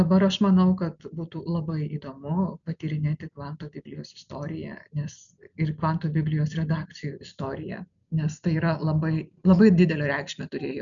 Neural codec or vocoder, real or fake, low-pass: none; real; 7.2 kHz